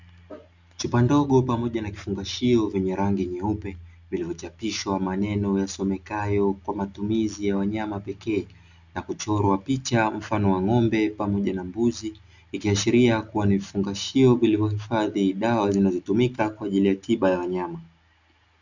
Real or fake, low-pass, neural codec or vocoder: real; 7.2 kHz; none